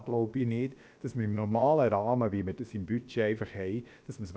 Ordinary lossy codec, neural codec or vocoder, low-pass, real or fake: none; codec, 16 kHz, about 1 kbps, DyCAST, with the encoder's durations; none; fake